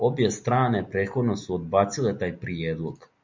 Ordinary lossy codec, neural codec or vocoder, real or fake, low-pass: MP3, 64 kbps; none; real; 7.2 kHz